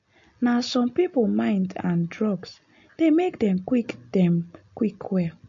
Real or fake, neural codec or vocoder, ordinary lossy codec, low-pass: real; none; MP3, 48 kbps; 7.2 kHz